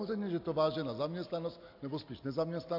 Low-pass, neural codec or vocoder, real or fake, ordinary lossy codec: 5.4 kHz; none; real; AAC, 48 kbps